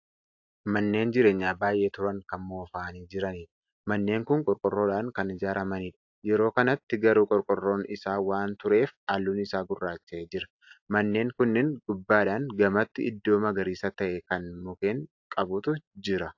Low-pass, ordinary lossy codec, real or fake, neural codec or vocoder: 7.2 kHz; AAC, 48 kbps; real; none